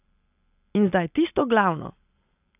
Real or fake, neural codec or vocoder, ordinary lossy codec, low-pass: real; none; none; 3.6 kHz